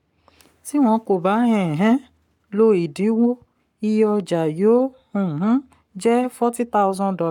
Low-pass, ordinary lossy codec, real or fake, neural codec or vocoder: 19.8 kHz; none; fake; codec, 44.1 kHz, 7.8 kbps, Pupu-Codec